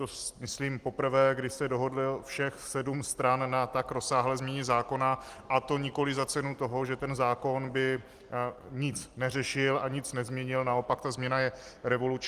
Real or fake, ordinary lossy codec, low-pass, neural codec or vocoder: real; Opus, 24 kbps; 14.4 kHz; none